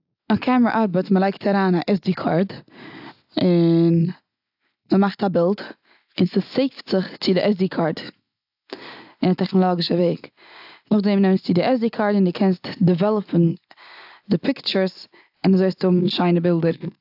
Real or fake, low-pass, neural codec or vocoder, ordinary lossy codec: real; 5.4 kHz; none; AAC, 48 kbps